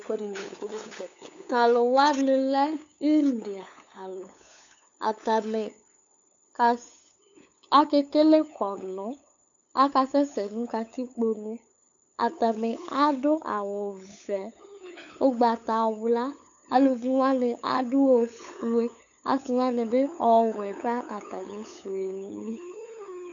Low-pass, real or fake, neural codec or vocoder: 7.2 kHz; fake; codec, 16 kHz, 8 kbps, FunCodec, trained on LibriTTS, 25 frames a second